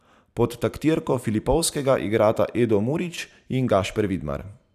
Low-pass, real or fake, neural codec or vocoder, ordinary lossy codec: 14.4 kHz; fake; vocoder, 44.1 kHz, 128 mel bands every 512 samples, BigVGAN v2; none